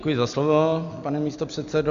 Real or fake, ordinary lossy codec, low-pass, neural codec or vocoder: real; Opus, 64 kbps; 7.2 kHz; none